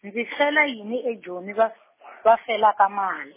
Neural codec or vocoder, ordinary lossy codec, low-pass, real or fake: none; MP3, 16 kbps; 3.6 kHz; real